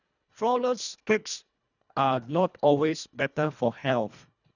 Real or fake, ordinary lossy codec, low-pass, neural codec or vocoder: fake; none; 7.2 kHz; codec, 24 kHz, 1.5 kbps, HILCodec